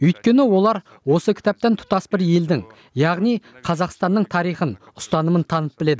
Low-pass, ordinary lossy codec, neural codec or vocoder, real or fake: none; none; none; real